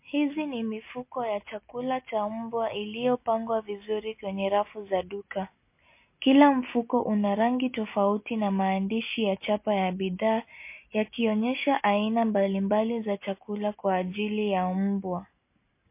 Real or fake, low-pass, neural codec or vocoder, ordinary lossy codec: real; 3.6 kHz; none; MP3, 24 kbps